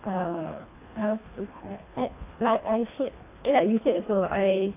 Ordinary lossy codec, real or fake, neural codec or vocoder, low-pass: AAC, 24 kbps; fake; codec, 24 kHz, 1.5 kbps, HILCodec; 3.6 kHz